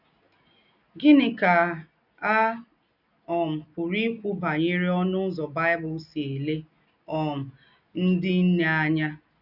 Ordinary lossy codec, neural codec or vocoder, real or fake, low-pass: none; none; real; 5.4 kHz